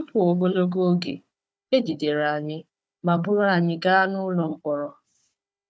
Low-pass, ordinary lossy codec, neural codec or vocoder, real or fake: none; none; codec, 16 kHz, 4 kbps, FunCodec, trained on Chinese and English, 50 frames a second; fake